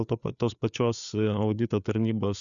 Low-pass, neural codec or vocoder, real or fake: 7.2 kHz; codec, 16 kHz, 4 kbps, FreqCodec, larger model; fake